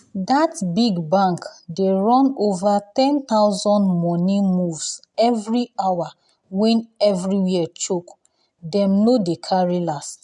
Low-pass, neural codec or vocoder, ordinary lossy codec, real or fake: 10.8 kHz; none; none; real